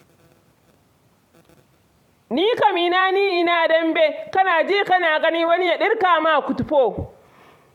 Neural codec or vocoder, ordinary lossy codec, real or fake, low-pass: vocoder, 44.1 kHz, 128 mel bands every 256 samples, BigVGAN v2; MP3, 96 kbps; fake; 19.8 kHz